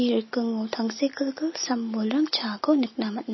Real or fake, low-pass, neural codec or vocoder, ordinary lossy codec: real; 7.2 kHz; none; MP3, 24 kbps